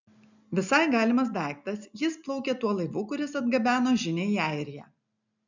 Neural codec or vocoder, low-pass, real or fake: none; 7.2 kHz; real